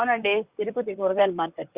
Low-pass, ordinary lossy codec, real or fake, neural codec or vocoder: 3.6 kHz; Opus, 64 kbps; fake; vocoder, 44.1 kHz, 128 mel bands, Pupu-Vocoder